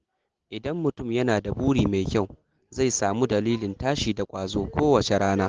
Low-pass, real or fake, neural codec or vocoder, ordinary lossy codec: 7.2 kHz; real; none; Opus, 16 kbps